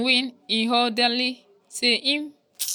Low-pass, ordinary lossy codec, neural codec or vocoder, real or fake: none; none; none; real